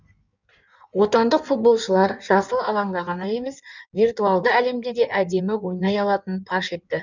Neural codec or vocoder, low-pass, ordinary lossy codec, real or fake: codec, 16 kHz in and 24 kHz out, 1.1 kbps, FireRedTTS-2 codec; 7.2 kHz; none; fake